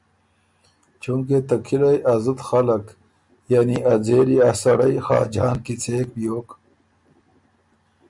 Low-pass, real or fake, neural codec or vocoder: 10.8 kHz; real; none